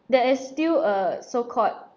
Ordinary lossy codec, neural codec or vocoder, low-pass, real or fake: none; none; none; real